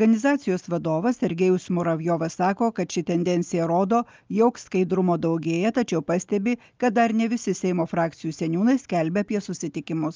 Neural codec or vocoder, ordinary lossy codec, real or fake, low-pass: none; Opus, 24 kbps; real; 7.2 kHz